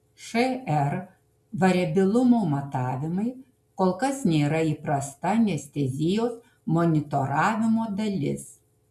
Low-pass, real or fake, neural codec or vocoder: 14.4 kHz; real; none